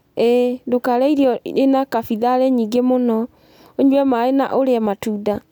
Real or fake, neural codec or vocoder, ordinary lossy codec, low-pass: real; none; none; 19.8 kHz